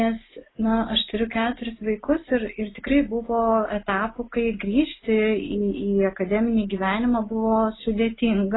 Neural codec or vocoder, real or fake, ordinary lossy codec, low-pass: none; real; AAC, 16 kbps; 7.2 kHz